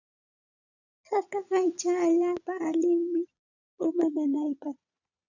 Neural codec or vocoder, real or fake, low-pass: vocoder, 22.05 kHz, 80 mel bands, Vocos; fake; 7.2 kHz